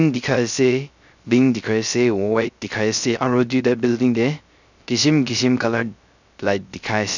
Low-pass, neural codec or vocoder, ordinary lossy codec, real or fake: 7.2 kHz; codec, 16 kHz, 0.3 kbps, FocalCodec; none; fake